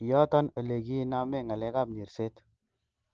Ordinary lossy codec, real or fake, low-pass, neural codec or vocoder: Opus, 16 kbps; real; 7.2 kHz; none